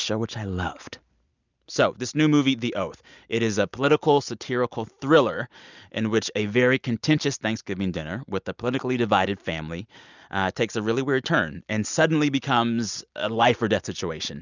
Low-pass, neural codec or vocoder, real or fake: 7.2 kHz; none; real